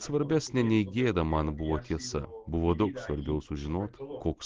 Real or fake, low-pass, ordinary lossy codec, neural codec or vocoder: real; 7.2 kHz; Opus, 16 kbps; none